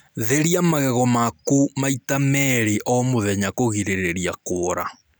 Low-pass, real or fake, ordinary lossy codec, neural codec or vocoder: none; real; none; none